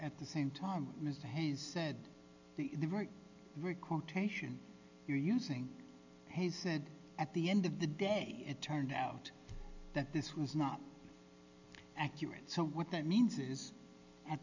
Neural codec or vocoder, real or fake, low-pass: none; real; 7.2 kHz